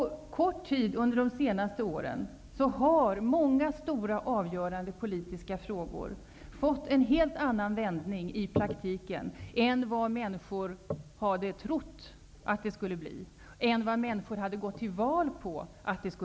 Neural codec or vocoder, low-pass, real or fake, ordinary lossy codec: none; none; real; none